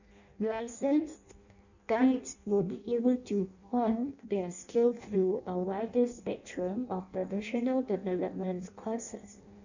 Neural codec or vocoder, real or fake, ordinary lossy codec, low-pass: codec, 16 kHz in and 24 kHz out, 0.6 kbps, FireRedTTS-2 codec; fake; none; 7.2 kHz